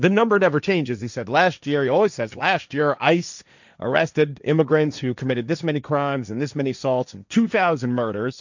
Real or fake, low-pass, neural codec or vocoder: fake; 7.2 kHz; codec, 16 kHz, 1.1 kbps, Voila-Tokenizer